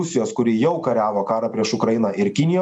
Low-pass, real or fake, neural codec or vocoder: 10.8 kHz; real; none